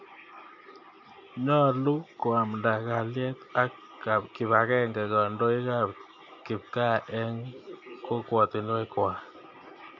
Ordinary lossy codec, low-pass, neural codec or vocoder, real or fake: MP3, 48 kbps; 7.2 kHz; none; real